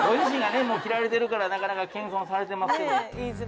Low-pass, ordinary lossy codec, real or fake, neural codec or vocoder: none; none; real; none